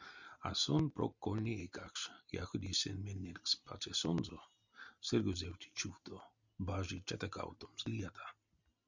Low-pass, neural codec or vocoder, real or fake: 7.2 kHz; none; real